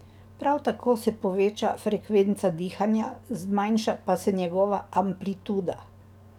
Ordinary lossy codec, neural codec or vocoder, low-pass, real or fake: none; codec, 44.1 kHz, 7.8 kbps, DAC; 19.8 kHz; fake